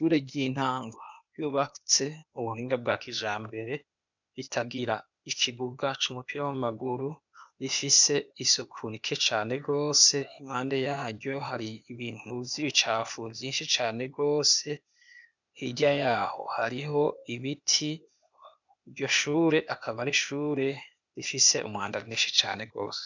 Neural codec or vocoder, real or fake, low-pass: codec, 16 kHz, 0.8 kbps, ZipCodec; fake; 7.2 kHz